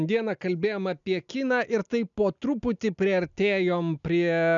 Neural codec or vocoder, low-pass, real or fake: none; 7.2 kHz; real